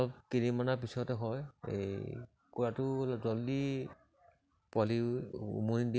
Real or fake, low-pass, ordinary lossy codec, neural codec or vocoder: real; none; none; none